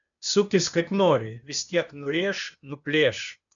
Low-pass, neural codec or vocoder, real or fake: 7.2 kHz; codec, 16 kHz, 0.8 kbps, ZipCodec; fake